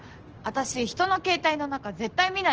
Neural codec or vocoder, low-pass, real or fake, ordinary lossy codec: none; 7.2 kHz; real; Opus, 16 kbps